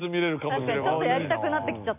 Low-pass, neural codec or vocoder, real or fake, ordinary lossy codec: 3.6 kHz; none; real; none